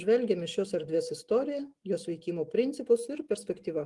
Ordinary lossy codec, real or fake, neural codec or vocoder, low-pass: Opus, 24 kbps; real; none; 10.8 kHz